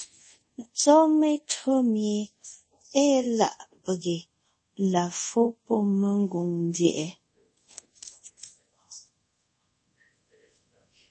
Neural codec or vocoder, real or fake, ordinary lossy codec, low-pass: codec, 24 kHz, 0.5 kbps, DualCodec; fake; MP3, 32 kbps; 10.8 kHz